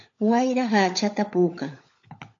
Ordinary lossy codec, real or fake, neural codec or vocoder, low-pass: AAC, 48 kbps; fake; codec, 16 kHz, 16 kbps, FunCodec, trained on LibriTTS, 50 frames a second; 7.2 kHz